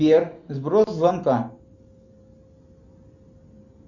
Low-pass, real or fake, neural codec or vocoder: 7.2 kHz; real; none